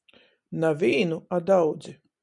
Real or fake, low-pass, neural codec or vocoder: real; 10.8 kHz; none